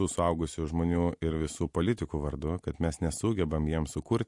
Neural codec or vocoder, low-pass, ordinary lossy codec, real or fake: none; 10.8 kHz; MP3, 48 kbps; real